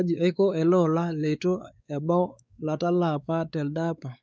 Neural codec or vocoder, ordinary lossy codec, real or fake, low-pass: codec, 16 kHz, 4 kbps, X-Codec, WavLM features, trained on Multilingual LibriSpeech; none; fake; none